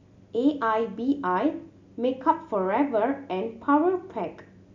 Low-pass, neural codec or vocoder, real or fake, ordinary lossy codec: 7.2 kHz; none; real; MP3, 64 kbps